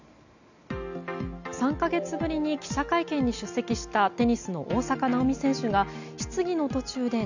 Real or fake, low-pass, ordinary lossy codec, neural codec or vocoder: real; 7.2 kHz; none; none